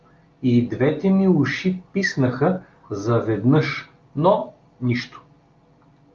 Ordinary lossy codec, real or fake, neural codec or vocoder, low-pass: Opus, 32 kbps; real; none; 7.2 kHz